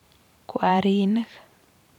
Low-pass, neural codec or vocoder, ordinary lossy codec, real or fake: 19.8 kHz; vocoder, 44.1 kHz, 128 mel bands every 512 samples, BigVGAN v2; none; fake